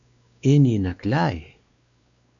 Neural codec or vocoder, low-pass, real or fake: codec, 16 kHz, 2 kbps, X-Codec, WavLM features, trained on Multilingual LibriSpeech; 7.2 kHz; fake